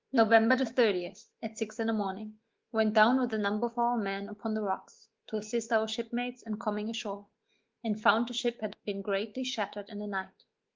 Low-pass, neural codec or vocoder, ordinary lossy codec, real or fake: 7.2 kHz; autoencoder, 48 kHz, 128 numbers a frame, DAC-VAE, trained on Japanese speech; Opus, 16 kbps; fake